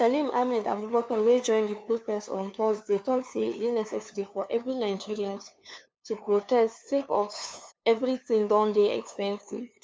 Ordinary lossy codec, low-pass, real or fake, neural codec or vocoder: none; none; fake; codec, 16 kHz, 2 kbps, FunCodec, trained on LibriTTS, 25 frames a second